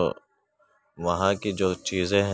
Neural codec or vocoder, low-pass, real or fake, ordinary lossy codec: none; none; real; none